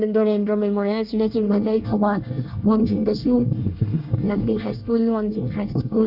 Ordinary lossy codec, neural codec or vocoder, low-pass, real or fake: AAC, 48 kbps; codec, 24 kHz, 1 kbps, SNAC; 5.4 kHz; fake